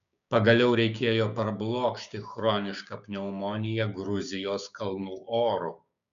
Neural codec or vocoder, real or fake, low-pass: codec, 16 kHz, 6 kbps, DAC; fake; 7.2 kHz